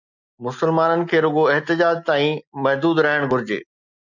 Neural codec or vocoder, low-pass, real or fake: none; 7.2 kHz; real